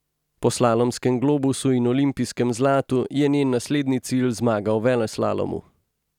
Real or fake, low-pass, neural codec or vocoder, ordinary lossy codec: real; 19.8 kHz; none; none